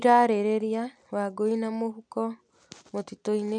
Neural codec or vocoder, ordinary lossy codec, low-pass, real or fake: none; none; 9.9 kHz; real